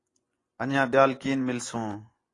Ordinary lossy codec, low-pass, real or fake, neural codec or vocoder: AAC, 32 kbps; 10.8 kHz; fake; vocoder, 24 kHz, 100 mel bands, Vocos